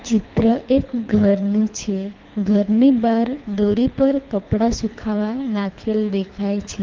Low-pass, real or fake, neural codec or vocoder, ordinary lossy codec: 7.2 kHz; fake; codec, 24 kHz, 3 kbps, HILCodec; Opus, 24 kbps